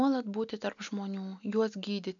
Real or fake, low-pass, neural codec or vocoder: real; 7.2 kHz; none